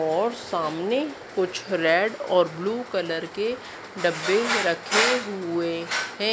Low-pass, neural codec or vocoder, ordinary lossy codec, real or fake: none; none; none; real